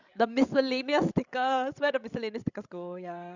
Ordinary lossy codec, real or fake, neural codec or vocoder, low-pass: none; fake; codec, 16 kHz, 16 kbps, FreqCodec, larger model; 7.2 kHz